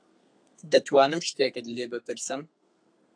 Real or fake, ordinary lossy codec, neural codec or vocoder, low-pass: fake; AAC, 64 kbps; codec, 32 kHz, 1.9 kbps, SNAC; 9.9 kHz